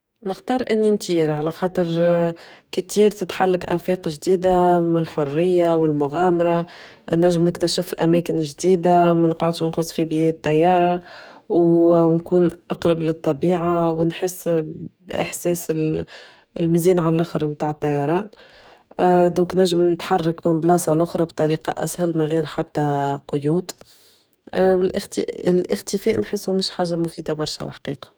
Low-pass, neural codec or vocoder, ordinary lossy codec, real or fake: none; codec, 44.1 kHz, 2.6 kbps, DAC; none; fake